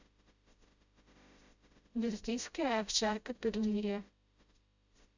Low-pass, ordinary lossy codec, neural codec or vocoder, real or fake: 7.2 kHz; Opus, 64 kbps; codec, 16 kHz, 0.5 kbps, FreqCodec, smaller model; fake